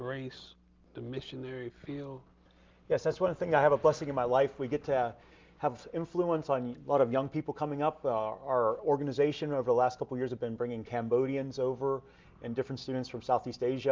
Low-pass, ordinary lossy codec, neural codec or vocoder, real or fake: 7.2 kHz; Opus, 24 kbps; none; real